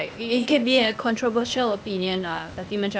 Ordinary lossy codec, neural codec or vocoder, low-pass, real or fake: none; codec, 16 kHz, 0.8 kbps, ZipCodec; none; fake